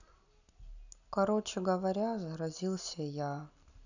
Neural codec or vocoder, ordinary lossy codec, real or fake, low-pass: none; none; real; 7.2 kHz